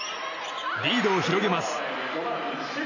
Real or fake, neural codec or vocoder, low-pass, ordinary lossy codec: real; none; 7.2 kHz; none